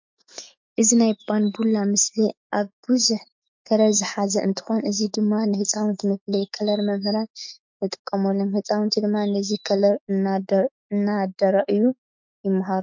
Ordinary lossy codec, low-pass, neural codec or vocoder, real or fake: MP3, 48 kbps; 7.2 kHz; autoencoder, 48 kHz, 128 numbers a frame, DAC-VAE, trained on Japanese speech; fake